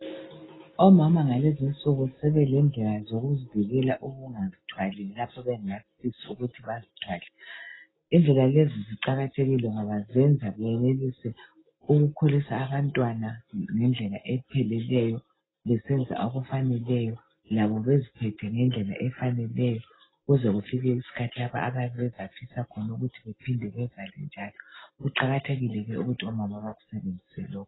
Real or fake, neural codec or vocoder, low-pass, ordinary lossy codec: real; none; 7.2 kHz; AAC, 16 kbps